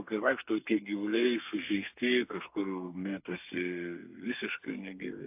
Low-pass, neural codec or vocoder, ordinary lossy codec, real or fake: 3.6 kHz; codec, 32 kHz, 1.9 kbps, SNAC; AAC, 32 kbps; fake